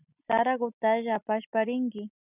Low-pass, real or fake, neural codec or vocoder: 3.6 kHz; real; none